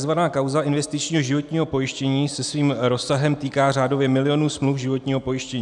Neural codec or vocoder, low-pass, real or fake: none; 10.8 kHz; real